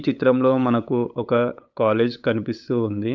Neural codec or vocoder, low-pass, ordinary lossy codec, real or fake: codec, 16 kHz, 8 kbps, FunCodec, trained on LibriTTS, 25 frames a second; 7.2 kHz; none; fake